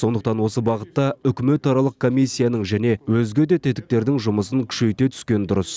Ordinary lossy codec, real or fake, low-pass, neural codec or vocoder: none; real; none; none